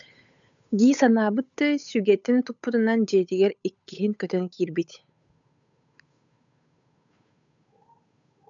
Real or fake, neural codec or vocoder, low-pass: fake; codec, 16 kHz, 8 kbps, FunCodec, trained on Chinese and English, 25 frames a second; 7.2 kHz